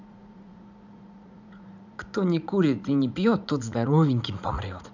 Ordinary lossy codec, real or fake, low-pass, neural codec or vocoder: none; fake; 7.2 kHz; vocoder, 44.1 kHz, 80 mel bands, Vocos